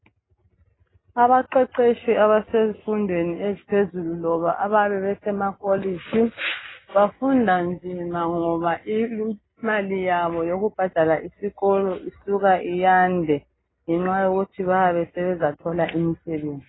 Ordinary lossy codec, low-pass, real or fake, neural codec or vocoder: AAC, 16 kbps; 7.2 kHz; real; none